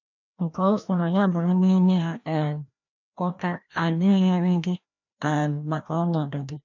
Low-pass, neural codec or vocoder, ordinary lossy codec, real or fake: 7.2 kHz; codec, 16 kHz, 1 kbps, FreqCodec, larger model; none; fake